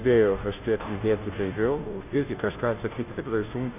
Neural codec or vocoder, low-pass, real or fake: codec, 16 kHz, 0.5 kbps, FunCodec, trained on Chinese and English, 25 frames a second; 3.6 kHz; fake